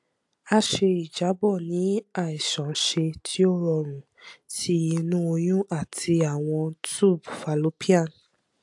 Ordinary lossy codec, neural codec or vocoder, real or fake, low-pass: AAC, 64 kbps; none; real; 10.8 kHz